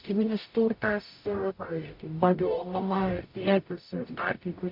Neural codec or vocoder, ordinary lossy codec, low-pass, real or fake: codec, 44.1 kHz, 0.9 kbps, DAC; MP3, 48 kbps; 5.4 kHz; fake